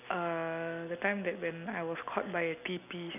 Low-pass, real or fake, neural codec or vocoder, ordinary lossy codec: 3.6 kHz; real; none; none